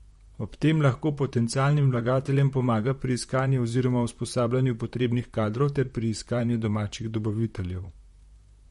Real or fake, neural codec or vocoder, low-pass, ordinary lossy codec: fake; vocoder, 44.1 kHz, 128 mel bands, Pupu-Vocoder; 19.8 kHz; MP3, 48 kbps